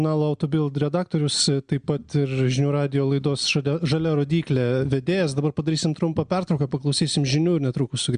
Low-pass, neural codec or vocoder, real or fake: 9.9 kHz; none; real